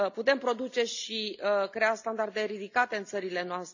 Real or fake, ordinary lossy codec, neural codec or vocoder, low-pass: real; none; none; 7.2 kHz